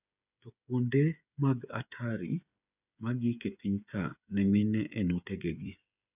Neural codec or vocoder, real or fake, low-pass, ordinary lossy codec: codec, 16 kHz, 8 kbps, FreqCodec, smaller model; fake; 3.6 kHz; none